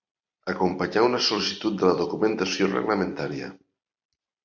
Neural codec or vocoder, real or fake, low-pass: vocoder, 44.1 kHz, 128 mel bands every 256 samples, BigVGAN v2; fake; 7.2 kHz